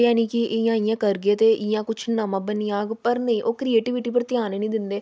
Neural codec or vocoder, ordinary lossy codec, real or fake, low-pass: none; none; real; none